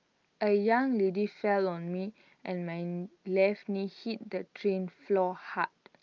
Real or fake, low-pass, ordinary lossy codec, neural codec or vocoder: real; 7.2 kHz; Opus, 24 kbps; none